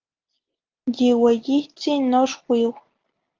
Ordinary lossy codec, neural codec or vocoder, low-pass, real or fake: Opus, 32 kbps; none; 7.2 kHz; real